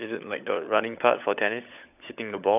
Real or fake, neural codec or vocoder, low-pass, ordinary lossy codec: fake; codec, 16 kHz, 16 kbps, FunCodec, trained on LibriTTS, 50 frames a second; 3.6 kHz; none